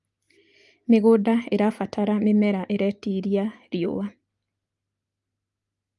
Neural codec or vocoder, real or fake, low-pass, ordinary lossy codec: none; real; 10.8 kHz; Opus, 32 kbps